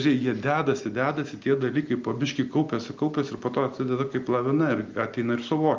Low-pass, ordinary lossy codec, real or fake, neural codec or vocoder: 7.2 kHz; Opus, 24 kbps; real; none